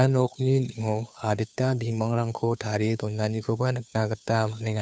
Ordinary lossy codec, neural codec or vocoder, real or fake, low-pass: none; codec, 16 kHz, 2 kbps, FunCodec, trained on Chinese and English, 25 frames a second; fake; none